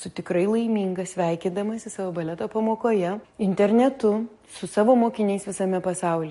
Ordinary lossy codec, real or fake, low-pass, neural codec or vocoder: MP3, 48 kbps; real; 14.4 kHz; none